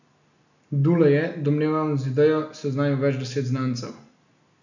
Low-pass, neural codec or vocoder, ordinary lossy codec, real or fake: 7.2 kHz; none; none; real